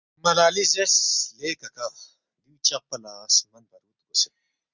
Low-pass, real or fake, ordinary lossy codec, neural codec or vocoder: 7.2 kHz; real; Opus, 64 kbps; none